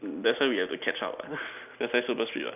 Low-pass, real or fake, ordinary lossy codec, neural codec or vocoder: 3.6 kHz; real; none; none